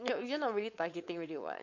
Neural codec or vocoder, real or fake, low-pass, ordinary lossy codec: codec, 16 kHz, 4.8 kbps, FACodec; fake; 7.2 kHz; AAC, 48 kbps